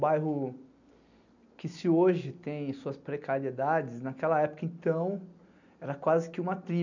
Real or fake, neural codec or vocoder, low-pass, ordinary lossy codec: real; none; 7.2 kHz; none